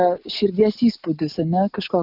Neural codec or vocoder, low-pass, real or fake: none; 5.4 kHz; real